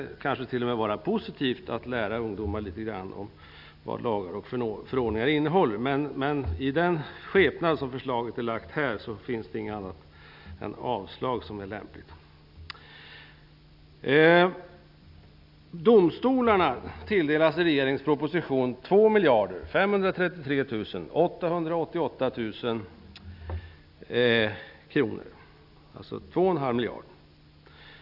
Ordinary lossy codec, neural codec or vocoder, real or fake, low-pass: none; none; real; 5.4 kHz